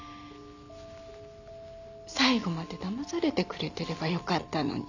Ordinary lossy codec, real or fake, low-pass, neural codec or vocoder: none; real; 7.2 kHz; none